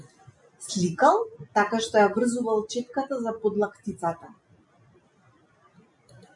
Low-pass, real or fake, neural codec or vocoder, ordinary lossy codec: 10.8 kHz; real; none; MP3, 64 kbps